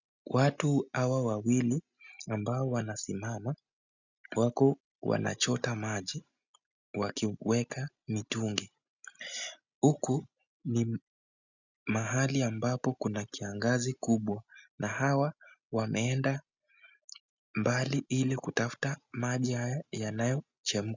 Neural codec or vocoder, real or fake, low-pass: none; real; 7.2 kHz